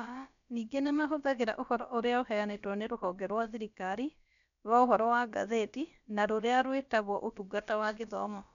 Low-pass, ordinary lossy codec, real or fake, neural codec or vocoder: 7.2 kHz; none; fake; codec, 16 kHz, about 1 kbps, DyCAST, with the encoder's durations